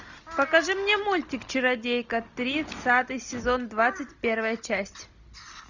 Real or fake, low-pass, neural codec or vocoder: real; 7.2 kHz; none